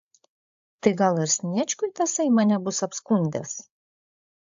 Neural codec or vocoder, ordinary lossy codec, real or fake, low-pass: codec, 16 kHz, 8 kbps, FreqCodec, larger model; AAC, 64 kbps; fake; 7.2 kHz